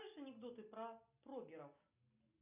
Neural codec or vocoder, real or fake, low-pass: none; real; 3.6 kHz